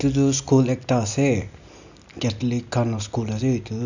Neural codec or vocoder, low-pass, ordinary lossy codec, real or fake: none; 7.2 kHz; none; real